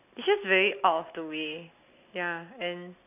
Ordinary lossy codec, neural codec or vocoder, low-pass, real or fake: none; none; 3.6 kHz; real